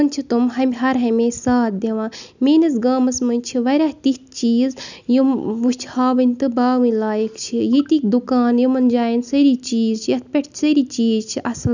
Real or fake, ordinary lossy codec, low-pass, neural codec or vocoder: real; none; 7.2 kHz; none